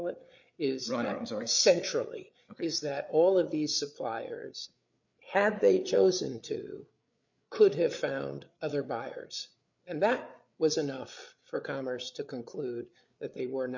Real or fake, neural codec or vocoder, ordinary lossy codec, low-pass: fake; codec, 16 kHz, 8 kbps, FreqCodec, larger model; MP3, 48 kbps; 7.2 kHz